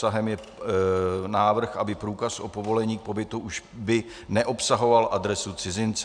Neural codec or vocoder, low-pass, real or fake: none; 9.9 kHz; real